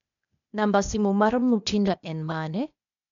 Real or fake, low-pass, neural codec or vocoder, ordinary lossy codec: fake; 7.2 kHz; codec, 16 kHz, 0.8 kbps, ZipCodec; none